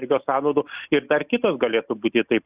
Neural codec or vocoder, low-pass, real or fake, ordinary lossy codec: none; 3.6 kHz; real; Opus, 64 kbps